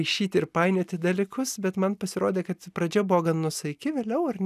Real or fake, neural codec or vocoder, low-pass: real; none; 14.4 kHz